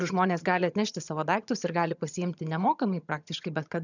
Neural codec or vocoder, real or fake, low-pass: vocoder, 22.05 kHz, 80 mel bands, HiFi-GAN; fake; 7.2 kHz